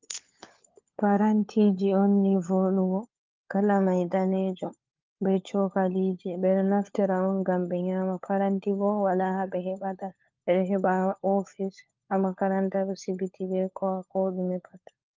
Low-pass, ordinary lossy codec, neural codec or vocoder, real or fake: 7.2 kHz; Opus, 24 kbps; codec, 16 kHz, 4 kbps, FunCodec, trained on LibriTTS, 50 frames a second; fake